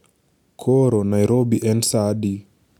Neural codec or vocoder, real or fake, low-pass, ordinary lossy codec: none; real; 19.8 kHz; none